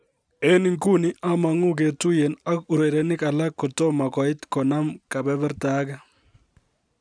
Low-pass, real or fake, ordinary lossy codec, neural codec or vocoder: 9.9 kHz; real; none; none